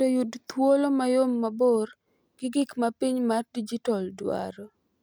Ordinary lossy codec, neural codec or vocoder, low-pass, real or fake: none; none; none; real